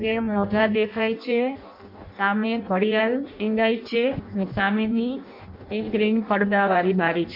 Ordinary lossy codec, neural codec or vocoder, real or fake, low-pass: MP3, 48 kbps; codec, 16 kHz in and 24 kHz out, 0.6 kbps, FireRedTTS-2 codec; fake; 5.4 kHz